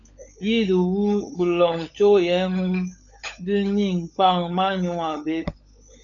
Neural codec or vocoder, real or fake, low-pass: codec, 16 kHz, 16 kbps, FunCodec, trained on LibriTTS, 50 frames a second; fake; 7.2 kHz